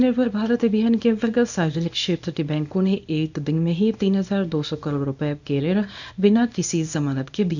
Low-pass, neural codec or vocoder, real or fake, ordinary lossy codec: 7.2 kHz; codec, 24 kHz, 0.9 kbps, WavTokenizer, small release; fake; none